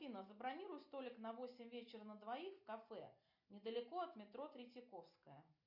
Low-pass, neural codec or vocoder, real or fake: 5.4 kHz; none; real